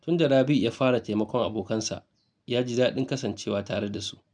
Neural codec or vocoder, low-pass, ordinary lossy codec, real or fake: none; 9.9 kHz; none; real